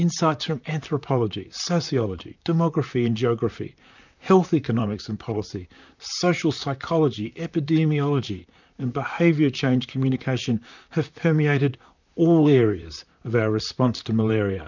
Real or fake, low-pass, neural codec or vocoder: fake; 7.2 kHz; vocoder, 44.1 kHz, 128 mel bands, Pupu-Vocoder